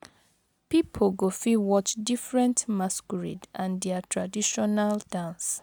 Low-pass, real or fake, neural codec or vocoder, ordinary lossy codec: none; real; none; none